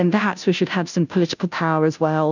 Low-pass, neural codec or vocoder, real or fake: 7.2 kHz; codec, 16 kHz, 0.5 kbps, FunCodec, trained on Chinese and English, 25 frames a second; fake